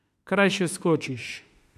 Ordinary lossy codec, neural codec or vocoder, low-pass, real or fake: MP3, 96 kbps; autoencoder, 48 kHz, 32 numbers a frame, DAC-VAE, trained on Japanese speech; 14.4 kHz; fake